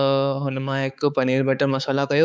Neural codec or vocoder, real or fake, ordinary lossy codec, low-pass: codec, 16 kHz, 4 kbps, X-Codec, HuBERT features, trained on balanced general audio; fake; none; none